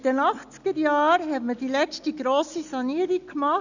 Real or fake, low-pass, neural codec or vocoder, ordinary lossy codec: real; 7.2 kHz; none; none